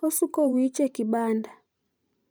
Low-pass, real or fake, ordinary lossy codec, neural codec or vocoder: none; fake; none; vocoder, 44.1 kHz, 128 mel bands every 512 samples, BigVGAN v2